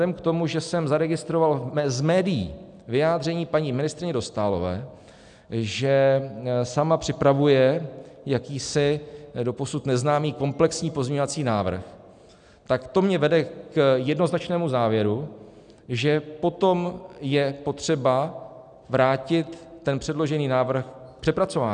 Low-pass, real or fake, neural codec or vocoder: 9.9 kHz; real; none